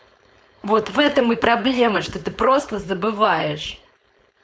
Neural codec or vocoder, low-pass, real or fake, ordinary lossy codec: codec, 16 kHz, 4.8 kbps, FACodec; none; fake; none